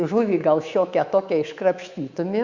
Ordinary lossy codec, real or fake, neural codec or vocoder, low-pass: Opus, 64 kbps; fake; codec, 24 kHz, 3.1 kbps, DualCodec; 7.2 kHz